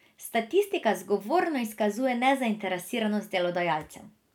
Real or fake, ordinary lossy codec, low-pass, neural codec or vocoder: real; none; 19.8 kHz; none